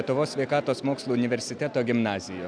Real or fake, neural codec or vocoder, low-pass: real; none; 9.9 kHz